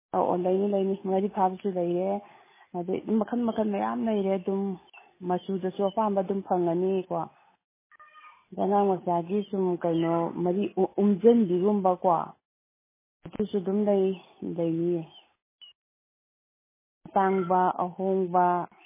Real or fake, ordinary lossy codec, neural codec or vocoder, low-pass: real; MP3, 16 kbps; none; 3.6 kHz